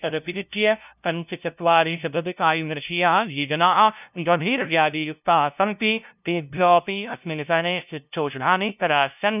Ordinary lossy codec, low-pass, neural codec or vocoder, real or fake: none; 3.6 kHz; codec, 16 kHz, 0.5 kbps, FunCodec, trained on LibriTTS, 25 frames a second; fake